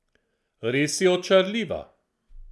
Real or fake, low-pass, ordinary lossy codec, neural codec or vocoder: real; none; none; none